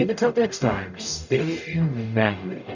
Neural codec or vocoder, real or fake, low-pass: codec, 44.1 kHz, 0.9 kbps, DAC; fake; 7.2 kHz